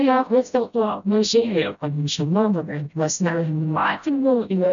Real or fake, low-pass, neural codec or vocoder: fake; 7.2 kHz; codec, 16 kHz, 0.5 kbps, FreqCodec, smaller model